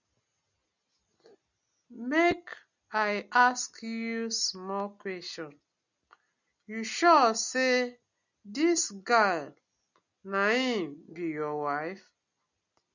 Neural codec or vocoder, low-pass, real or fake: none; 7.2 kHz; real